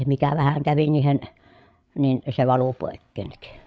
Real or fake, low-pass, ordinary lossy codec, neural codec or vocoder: fake; none; none; codec, 16 kHz, 8 kbps, FreqCodec, larger model